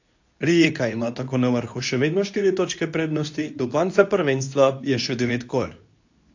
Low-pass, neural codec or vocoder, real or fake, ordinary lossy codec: 7.2 kHz; codec, 24 kHz, 0.9 kbps, WavTokenizer, medium speech release version 2; fake; AAC, 48 kbps